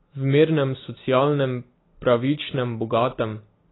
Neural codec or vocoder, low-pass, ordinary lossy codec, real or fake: none; 7.2 kHz; AAC, 16 kbps; real